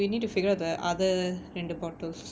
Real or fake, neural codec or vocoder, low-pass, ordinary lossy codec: real; none; none; none